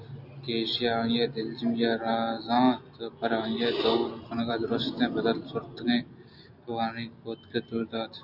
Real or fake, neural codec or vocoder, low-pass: real; none; 5.4 kHz